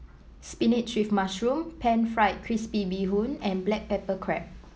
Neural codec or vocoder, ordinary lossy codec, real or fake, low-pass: none; none; real; none